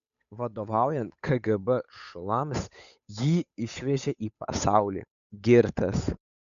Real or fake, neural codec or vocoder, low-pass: fake; codec, 16 kHz, 8 kbps, FunCodec, trained on Chinese and English, 25 frames a second; 7.2 kHz